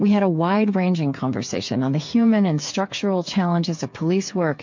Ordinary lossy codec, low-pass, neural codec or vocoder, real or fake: MP3, 48 kbps; 7.2 kHz; codec, 16 kHz, 8 kbps, FreqCodec, smaller model; fake